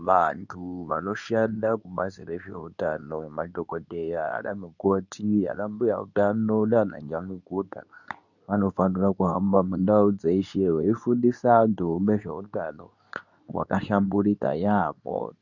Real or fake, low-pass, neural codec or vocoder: fake; 7.2 kHz; codec, 24 kHz, 0.9 kbps, WavTokenizer, medium speech release version 2